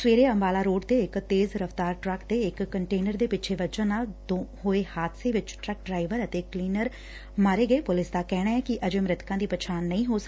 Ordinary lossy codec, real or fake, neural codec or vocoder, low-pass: none; real; none; none